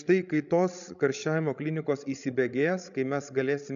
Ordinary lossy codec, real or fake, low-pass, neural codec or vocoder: AAC, 96 kbps; fake; 7.2 kHz; codec, 16 kHz, 16 kbps, FreqCodec, larger model